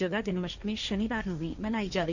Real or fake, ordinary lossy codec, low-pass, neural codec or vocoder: fake; none; 7.2 kHz; codec, 16 kHz, 1.1 kbps, Voila-Tokenizer